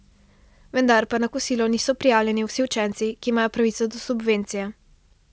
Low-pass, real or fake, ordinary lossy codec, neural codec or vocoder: none; real; none; none